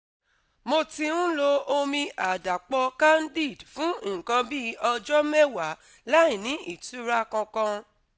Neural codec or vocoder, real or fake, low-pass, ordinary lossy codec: none; real; none; none